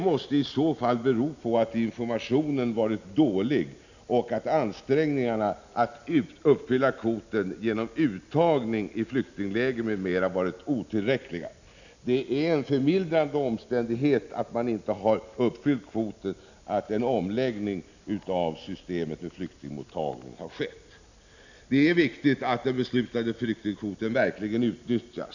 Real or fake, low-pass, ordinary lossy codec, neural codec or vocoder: real; 7.2 kHz; none; none